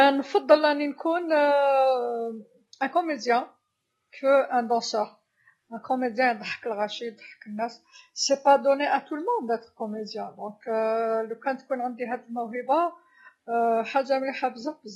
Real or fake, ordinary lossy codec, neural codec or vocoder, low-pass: real; AAC, 32 kbps; none; 19.8 kHz